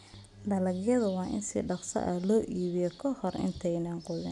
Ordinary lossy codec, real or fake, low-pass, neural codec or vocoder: none; real; 10.8 kHz; none